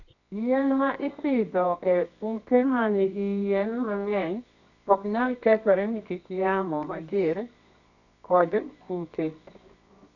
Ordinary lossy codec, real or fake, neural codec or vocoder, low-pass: none; fake; codec, 24 kHz, 0.9 kbps, WavTokenizer, medium music audio release; 7.2 kHz